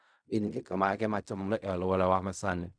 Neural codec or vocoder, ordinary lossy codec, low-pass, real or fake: codec, 16 kHz in and 24 kHz out, 0.4 kbps, LongCat-Audio-Codec, fine tuned four codebook decoder; none; 9.9 kHz; fake